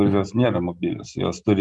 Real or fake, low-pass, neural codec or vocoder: real; 10.8 kHz; none